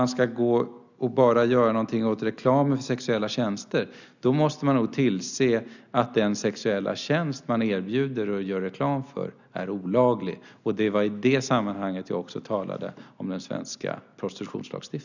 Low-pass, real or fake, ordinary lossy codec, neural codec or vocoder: 7.2 kHz; real; none; none